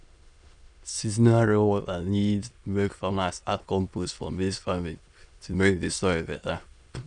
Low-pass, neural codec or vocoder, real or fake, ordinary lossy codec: 9.9 kHz; autoencoder, 22.05 kHz, a latent of 192 numbers a frame, VITS, trained on many speakers; fake; none